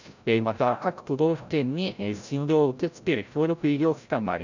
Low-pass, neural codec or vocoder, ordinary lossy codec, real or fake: 7.2 kHz; codec, 16 kHz, 0.5 kbps, FreqCodec, larger model; none; fake